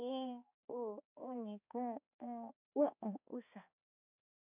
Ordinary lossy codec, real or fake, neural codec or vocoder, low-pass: none; fake; codec, 16 kHz, 2 kbps, X-Codec, HuBERT features, trained on balanced general audio; 3.6 kHz